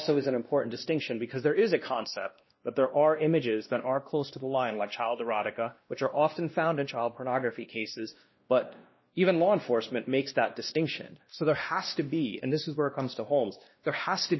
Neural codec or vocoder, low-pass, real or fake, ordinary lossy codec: codec, 16 kHz, 1 kbps, X-Codec, WavLM features, trained on Multilingual LibriSpeech; 7.2 kHz; fake; MP3, 24 kbps